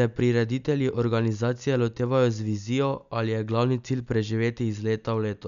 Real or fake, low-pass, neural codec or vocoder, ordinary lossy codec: real; 7.2 kHz; none; none